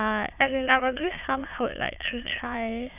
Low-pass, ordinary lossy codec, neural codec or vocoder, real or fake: 3.6 kHz; none; autoencoder, 22.05 kHz, a latent of 192 numbers a frame, VITS, trained on many speakers; fake